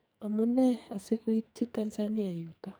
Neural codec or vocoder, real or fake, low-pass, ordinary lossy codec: codec, 44.1 kHz, 2.6 kbps, SNAC; fake; none; none